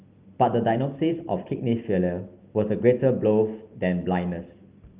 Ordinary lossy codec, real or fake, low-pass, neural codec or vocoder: Opus, 32 kbps; real; 3.6 kHz; none